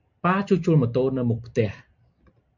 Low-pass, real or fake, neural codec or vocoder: 7.2 kHz; fake; vocoder, 44.1 kHz, 128 mel bands every 256 samples, BigVGAN v2